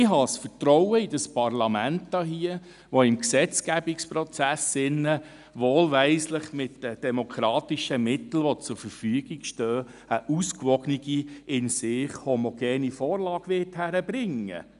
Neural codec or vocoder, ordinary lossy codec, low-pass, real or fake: none; none; 10.8 kHz; real